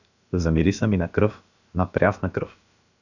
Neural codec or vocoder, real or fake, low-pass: codec, 16 kHz, about 1 kbps, DyCAST, with the encoder's durations; fake; 7.2 kHz